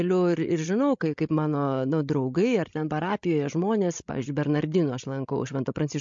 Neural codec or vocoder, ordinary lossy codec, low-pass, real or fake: codec, 16 kHz, 16 kbps, FreqCodec, larger model; MP3, 48 kbps; 7.2 kHz; fake